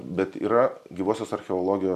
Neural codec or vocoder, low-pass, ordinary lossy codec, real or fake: none; 14.4 kHz; MP3, 96 kbps; real